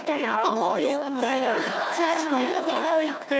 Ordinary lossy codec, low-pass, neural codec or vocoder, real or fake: none; none; codec, 16 kHz, 1 kbps, FunCodec, trained on Chinese and English, 50 frames a second; fake